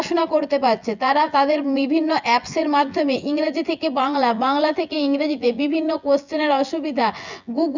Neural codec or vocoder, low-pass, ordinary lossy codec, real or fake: vocoder, 24 kHz, 100 mel bands, Vocos; 7.2 kHz; Opus, 64 kbps; fake